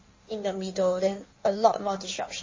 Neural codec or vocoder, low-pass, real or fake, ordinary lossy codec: codec, 16 kHz in and 24 kHz out, 1.1 kbps, FireRedTTS-2 codec; 7.2 kHz; fake; MP3, 32 kbps